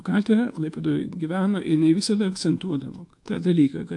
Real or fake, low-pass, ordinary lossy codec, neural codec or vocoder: fake; 10.8 kHz; AAC, 64 kbps; codec, 24 kHz, 1.2 kbps, DualCodec